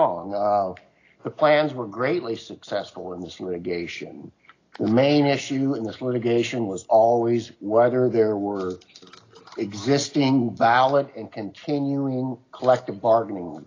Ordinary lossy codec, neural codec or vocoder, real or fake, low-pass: AAC, 32 kbps; none; real; 7.2 kHz